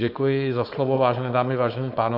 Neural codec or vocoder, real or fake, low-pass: codec, 16 kHz, 4.8 kbps, FACodec; fake; 5.4 kHz